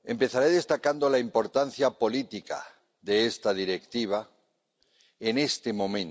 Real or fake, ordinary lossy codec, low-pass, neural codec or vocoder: real; none; none; none